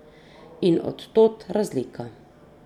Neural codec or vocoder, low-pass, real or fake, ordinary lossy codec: none; 19.8 kHz; real; none